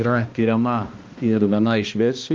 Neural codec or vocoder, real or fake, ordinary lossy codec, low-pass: codec, 16 kHz, 1 kbps, X-Codec, HuBERT features, trained on balanced general audio; fake; Opus, 24 kbps; 7.2 kHz